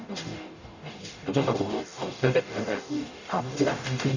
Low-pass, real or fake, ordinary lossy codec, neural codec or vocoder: 7.2 kHz; fake; none; codec, 44.1 kHz, 0.9 kbps, DAC